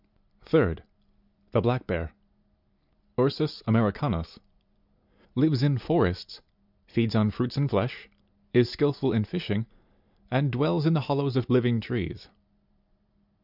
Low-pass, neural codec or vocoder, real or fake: 5.4 kHz; none; real